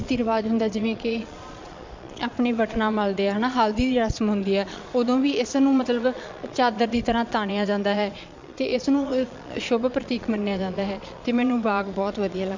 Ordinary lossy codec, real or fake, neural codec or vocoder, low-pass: MP3, 64 kbps; fake; vocoder, 22.05 kHz, 80 mel bands, Vocos; 7.2 kHz